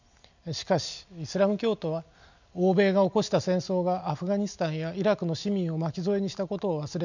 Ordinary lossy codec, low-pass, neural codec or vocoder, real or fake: none; 7.2 kHz; none; real